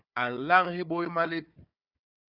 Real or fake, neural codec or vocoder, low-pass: fake; codec, 16 kHz, 4 kbps, FunCodec, trained on Chinese and English, 50 frames a second; 5.4 kHz